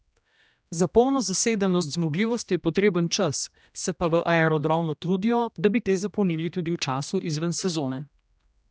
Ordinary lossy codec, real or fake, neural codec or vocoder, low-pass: none; fake; codec, 16 kHz, 1 kbps, X-Codec, HuBERT features, trained on general audio; none